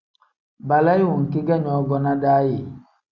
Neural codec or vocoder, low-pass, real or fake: none; 7.2 kHz; real